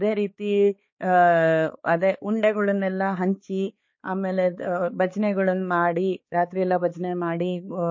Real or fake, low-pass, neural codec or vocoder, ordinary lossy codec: fake; 7.2 kHz; codec, 16 kHz, 4 kbps, X-Codec, HuBERT features, trained on balanced general audio; MP3, 32 kbps